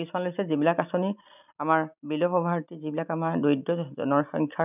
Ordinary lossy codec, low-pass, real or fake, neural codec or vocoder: none; 3.6 kHz; real; none